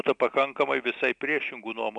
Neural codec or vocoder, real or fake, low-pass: none; real; 9.9 kHz